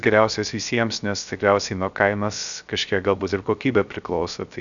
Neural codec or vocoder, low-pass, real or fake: codec, 16 kHz, 0.3 kbps, FocalCodec; 7.2 kHz; fake